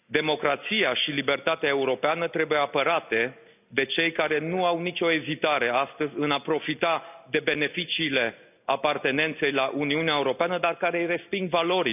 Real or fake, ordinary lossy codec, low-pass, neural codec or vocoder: real; none; 3.6 kHz; none